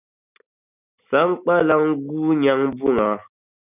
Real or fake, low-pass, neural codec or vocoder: real; 3.6 kHz; none